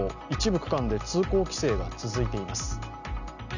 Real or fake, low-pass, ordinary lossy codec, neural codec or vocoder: real; 7.2 kHz; none; none